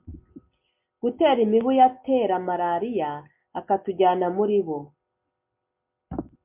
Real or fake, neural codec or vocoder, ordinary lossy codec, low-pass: real; none; MP3, 32 kbps; 3.6 kHz